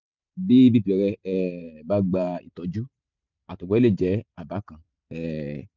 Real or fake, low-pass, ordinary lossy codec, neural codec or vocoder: fake; 7.2 kHz; none; vocoder, 44.1 kHz, 128 mel bands every 512 samples, BigVGAN v2